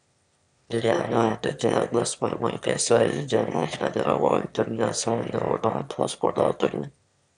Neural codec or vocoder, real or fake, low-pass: autoencoder, 22.05 kHz, a latent of 192 numbers a frame, VITS, trained on one speaker; fake; 9.9 kHz